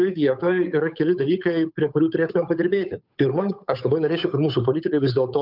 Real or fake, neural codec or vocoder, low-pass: fake; codec, 16 kHz, 8 kbps, FunCodec, trained on Chinese and English, 25 frames a second; 5.4 kHz